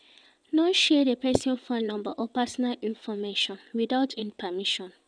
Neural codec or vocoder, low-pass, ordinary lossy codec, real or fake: vocoder, 22.05 kHz, 80 mel bands, WaveNeXt; 9.9 kHz; none; fake